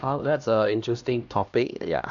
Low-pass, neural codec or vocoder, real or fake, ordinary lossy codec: 7.2 kHz; codec, 16 kHz, 1 kbps, X-Codec, HuBERT features, trained on LibriSpeech; fake; none